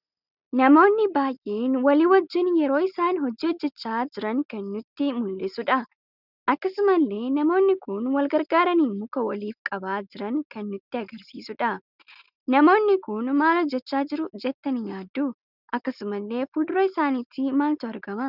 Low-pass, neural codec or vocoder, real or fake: 5.4 kHz; none; real